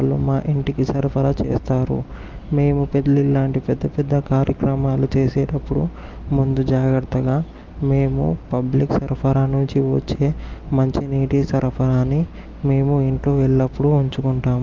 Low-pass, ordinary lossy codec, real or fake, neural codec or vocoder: 7.2 kHz; Opus, 24 kbps; real; none